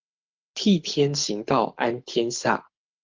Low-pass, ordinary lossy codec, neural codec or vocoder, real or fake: 7.2 kHz; Opus, 24 kbps; codec, 24 kHz, 6 kbps, HILCodec; fake